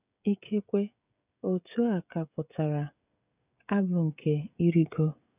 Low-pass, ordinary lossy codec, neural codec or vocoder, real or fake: 3.6 kHz; none; codec, 16 kHz, 16 kbps, FreqCodec, smaller model; fake